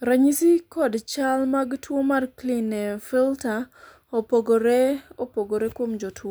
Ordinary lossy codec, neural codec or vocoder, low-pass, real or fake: none; none; none; real